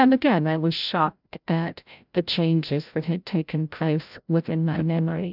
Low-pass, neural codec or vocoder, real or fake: 5.4 kHz; codec, 16 kHz, 0.5 kbps, FreqCodec, larger model; fake